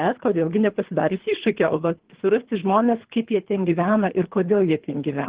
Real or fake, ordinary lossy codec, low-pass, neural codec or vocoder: fake; Opus, 16 kbps; 3.6 kHz; codec, 24 kHz, 3 kbps, HILCodec